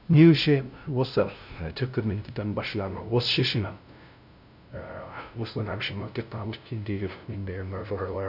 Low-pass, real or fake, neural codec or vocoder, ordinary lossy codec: 5.4 kHz; fake; codec, 16 kHz, 0.5 kbps, FunCodec, trained on LibriTTS, 25 frames a second; none